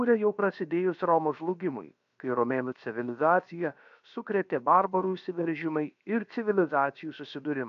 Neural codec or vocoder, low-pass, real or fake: codec, 16 kHz, about 1 kbps, DyCAST, with the encoder's durations; 7.2 kHz; fake